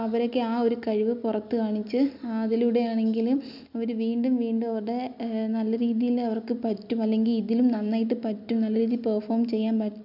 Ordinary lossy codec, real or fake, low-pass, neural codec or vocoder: none; real; 5.4 kHz; none